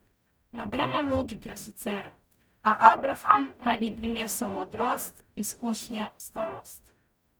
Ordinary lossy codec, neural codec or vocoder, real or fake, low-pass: none; codec, 44.1 kHz, 0.9 kbps, DAC; fake; none